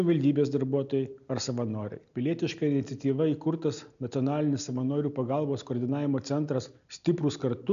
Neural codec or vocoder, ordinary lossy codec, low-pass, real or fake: none; MP3, 96 kbps; 7.2 kHz; real